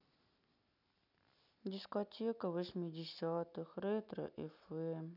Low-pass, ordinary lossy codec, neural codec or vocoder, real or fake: 5.4 kHz; none; none; real